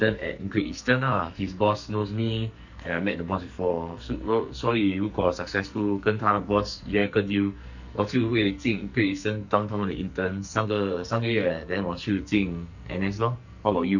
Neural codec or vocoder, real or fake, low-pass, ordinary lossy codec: codec, 44.1 kHz, 2.6 kbps, SNAC; fake; 7.2 kHz; none